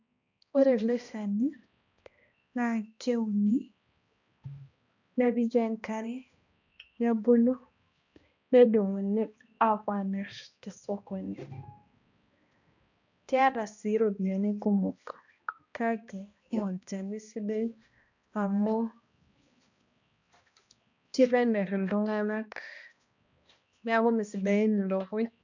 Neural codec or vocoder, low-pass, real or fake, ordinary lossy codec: codec, 16 kHz, 1 kbps, X-Codec, HuBERT features, trained on balanced general audio; 7.2 kHz; fake; none